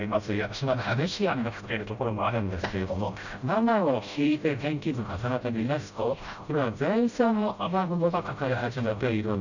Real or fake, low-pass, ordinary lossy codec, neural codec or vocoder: fake; 7.2 kHz; none; codec, 16 kHz, 0.5 kbps, FreqCodec, smaller model